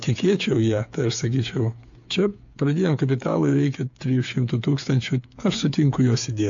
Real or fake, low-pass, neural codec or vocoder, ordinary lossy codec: fake; 7.2 kHz; codec, 16 kHz, 8 kbps, FreqCodec, smaller model; AAC, 64 kbps